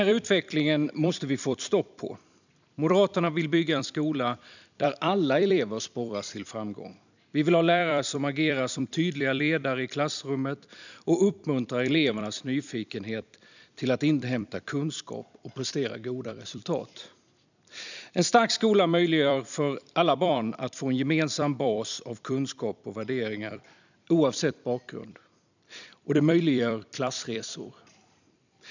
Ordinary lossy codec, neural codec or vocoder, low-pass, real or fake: none; vocoder, 44.1 kHz, 128 mel bands every 256 samples, BigVGAN v2; 7.2 kHz; fake